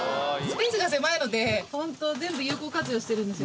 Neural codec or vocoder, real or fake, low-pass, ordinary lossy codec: none; real; none; none